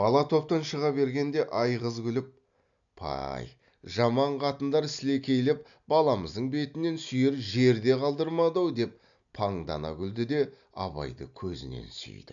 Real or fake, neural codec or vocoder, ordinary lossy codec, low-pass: real; none; none; 7.2 kHz